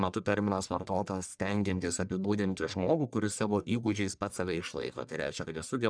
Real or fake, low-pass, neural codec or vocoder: fake; 9.9 kHz; codec, 44.1 kHz, 1.7 kbps, Pupu-Codec